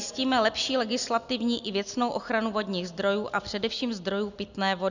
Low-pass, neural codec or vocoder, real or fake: 7.2 kHz; none; real